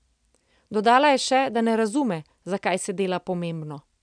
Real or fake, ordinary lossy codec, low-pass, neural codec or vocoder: real; none; 9.9 kHz; none